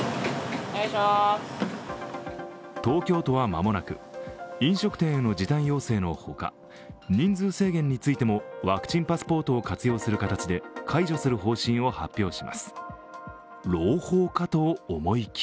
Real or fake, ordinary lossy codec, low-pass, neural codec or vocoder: real; none; none; none